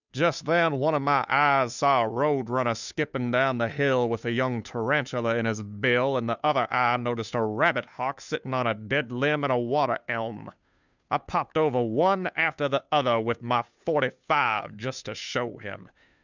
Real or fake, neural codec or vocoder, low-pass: fake; codec, 16 kHz, 2 kbps, FunCodec, trained on Chinese and English, 25 frames a second; 7.2 kHz